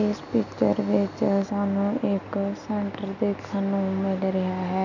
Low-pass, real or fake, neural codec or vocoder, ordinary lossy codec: 7.2 kHz; real; none; none